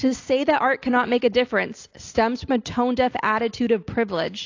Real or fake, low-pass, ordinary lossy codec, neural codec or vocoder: real; 7.2 kHz; AAC, 48 kbps; none